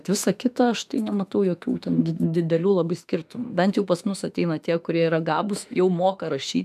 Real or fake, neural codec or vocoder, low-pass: fake; autoencoder, 48 kHz, 32 numbers a frame, DAC-VAE, trained on Japanese speech; 14.4 kHz